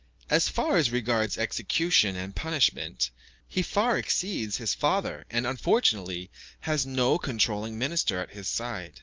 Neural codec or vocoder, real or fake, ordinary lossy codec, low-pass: none; real; Opus, 24 kbps; 7.2 kHz